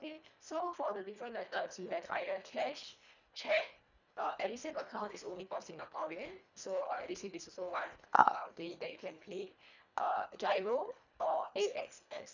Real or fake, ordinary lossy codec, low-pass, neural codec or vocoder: fake; none; 7.2 kHz; codec, 24 kHz, 1.5 kbps, HILCodec